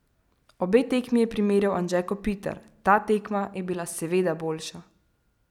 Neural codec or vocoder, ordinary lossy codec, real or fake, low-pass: none; none; real; 19.8 kHz